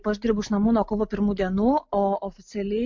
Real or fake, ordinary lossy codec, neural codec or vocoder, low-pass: real; MP3, 64 kbps; none; 7.2 kHz